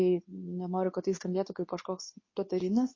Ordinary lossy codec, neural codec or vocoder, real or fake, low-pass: MP3, 32 kbps; codec, 24 kHz, 0.9 kbps, WavTokenizer, medium speech release version 2; fake; 7.2 kHz